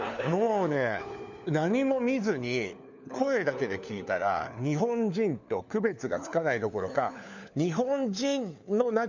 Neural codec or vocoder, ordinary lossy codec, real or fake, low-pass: codec, 16 kHz, 4 kbps, FunCodec, trained on LibriTTS, 50 frames a second; none; fake; 7.2 kHz